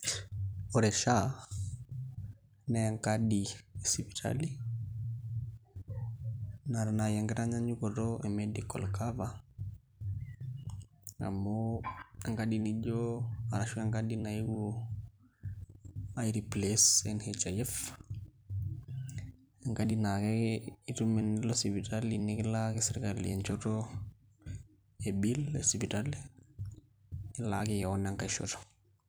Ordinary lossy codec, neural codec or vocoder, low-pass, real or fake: none; vocoder, 44.1 kHz, 128 mel bands every 256 samples, BigVGAN v2; none; fake